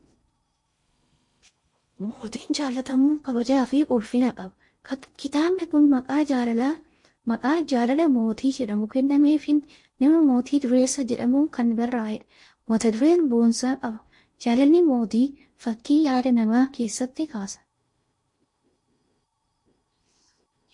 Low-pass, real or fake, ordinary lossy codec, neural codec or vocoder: 10.8 kHz; fake; MP3, 48 kbps; codec, 16 kHz in and 24 kHz out, 0.6 kbps, FocalCodec, streaming, 4096 codes